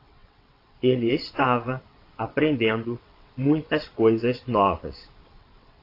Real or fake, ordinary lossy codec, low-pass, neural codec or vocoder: fake; AAC, 32 kbps; 5.4 kHz; vocoder, 22.05 kHz, 80 mel bands, WaveNeXt